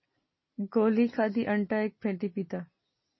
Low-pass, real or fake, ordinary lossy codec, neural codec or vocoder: 7.2 kHz; real; MP3, 24 kbps; none